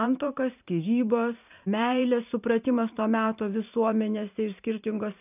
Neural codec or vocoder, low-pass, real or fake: vocoder, 44.1 kHz, 128 mel bands every 512 samples, BigVGAN v2; 3.6 kHz; fake